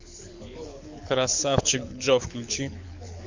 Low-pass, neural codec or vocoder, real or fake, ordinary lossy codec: 7.2 kHz; codec, 24 kHz, 6 kbps, HILCodec; fake; MP3, 64 kbps